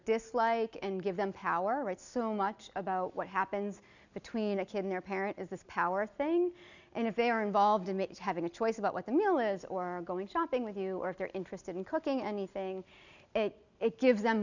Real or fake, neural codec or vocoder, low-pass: real; none; 7.2 kHz